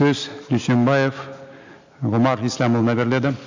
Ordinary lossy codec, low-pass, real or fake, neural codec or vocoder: AAC, 48 kbps; 7.2 kHz; real; none